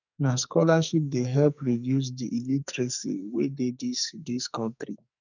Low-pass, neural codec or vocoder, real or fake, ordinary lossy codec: 7.2 kHz; codec, 44.1 kHz, 2.6 kbps, SNAC; fake; none